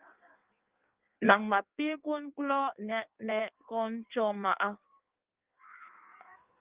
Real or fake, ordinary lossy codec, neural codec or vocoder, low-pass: fake; Opus, 32 kbps; codec, 16 kHz in and 24 kHz out, 1.1 kbps, FireRedTTS-2 codec; 3.6 kHz